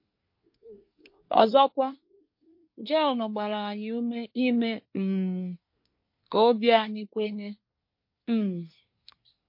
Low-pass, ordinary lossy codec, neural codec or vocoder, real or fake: 5.4 kHz; MP3, 32 kbps; codec, 24 kHz, 1 kbps, SNAC; fake